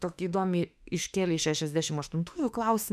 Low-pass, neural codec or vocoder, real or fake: 14.4 kHz; autoencoder, 48 kHz, 32 numbers a frame, DAC-VAE, trained on Japanese speech; fake